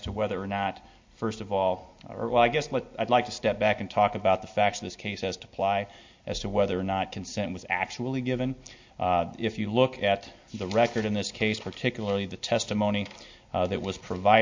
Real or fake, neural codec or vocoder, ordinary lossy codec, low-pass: real; none; MP3, 48 kbps; 7.2 kHz